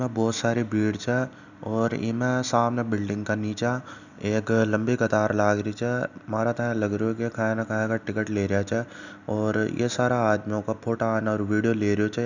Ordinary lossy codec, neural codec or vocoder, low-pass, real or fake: none; none; 7.2 kHz; real